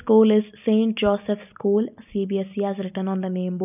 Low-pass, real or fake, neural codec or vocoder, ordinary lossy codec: 3.6 kHz; real; none; none